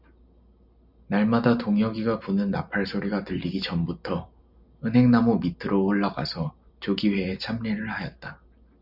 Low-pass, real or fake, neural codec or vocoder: 5.4 kHz; real; none